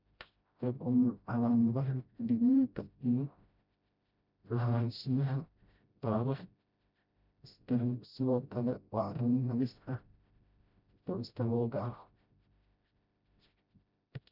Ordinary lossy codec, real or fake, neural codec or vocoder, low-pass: none; fake; codec, 16 kHz, 0.5 kbps, FreqCodec, smaller model; 5.4 kHz